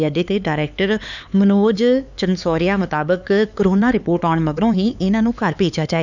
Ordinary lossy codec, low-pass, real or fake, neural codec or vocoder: none; 7.2 kHz; fake; codec, 16 kHz, 4 kbps, X-Codec, HuBERT features, trained on LibriSpeech